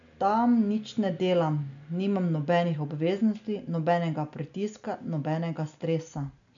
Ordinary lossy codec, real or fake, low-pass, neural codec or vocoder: none; real; 7.2 kHz; none